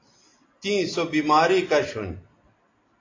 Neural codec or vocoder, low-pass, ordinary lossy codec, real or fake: none; 7.2 kHz; AAC, 32 kbps; real